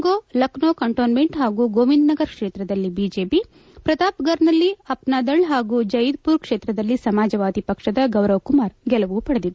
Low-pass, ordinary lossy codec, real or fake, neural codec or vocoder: none; none; real; none